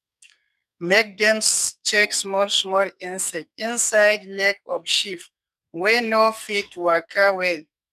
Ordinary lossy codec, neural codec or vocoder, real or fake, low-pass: none; codec, 44.1 kHz, 2.6 kbps, SNAC; fake; 14.4 kHz